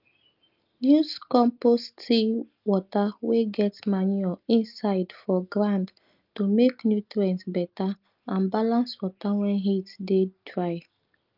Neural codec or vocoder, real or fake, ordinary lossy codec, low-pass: none; real; Opus, 24 kbps; 5.4 kHz